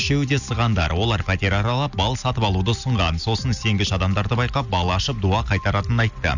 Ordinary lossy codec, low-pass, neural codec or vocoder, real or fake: none; 7.2 kHz; none; real